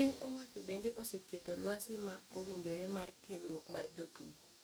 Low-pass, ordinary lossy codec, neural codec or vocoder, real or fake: none; none; codec, 44.1 kHz, 2.6 kbps, DAC; fake